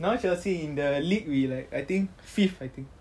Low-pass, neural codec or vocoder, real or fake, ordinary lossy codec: none; none; real; none